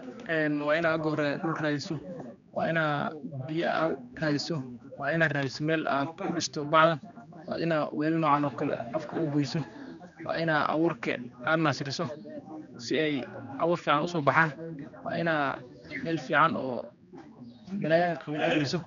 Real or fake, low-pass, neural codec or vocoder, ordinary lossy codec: fake; 7.2 kHz; codec, 16 kHz, 2 kbps, X-Codec, HuBERT features, trained on general audio; none